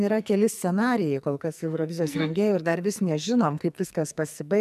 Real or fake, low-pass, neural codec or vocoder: fake; 14.4 kHz; codec, 44.1 kHz, 2.6 kbps, SNAC